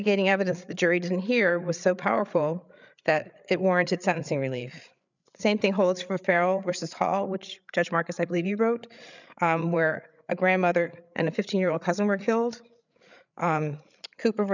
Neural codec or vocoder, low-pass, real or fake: codec, 16 kHz, 8 kbps, FreqCodec, larger model; 7.2 kHz; fake